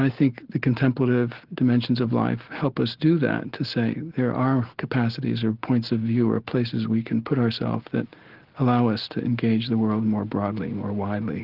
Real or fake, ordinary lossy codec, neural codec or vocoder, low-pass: real; Opus, 16 kbps; none; 5.4 kHz